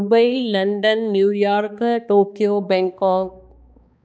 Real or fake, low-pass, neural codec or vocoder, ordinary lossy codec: fake; none; codec, 16 kHz, 2 kbps, X-Codec, HuBERT features, trained on balanced general audio; none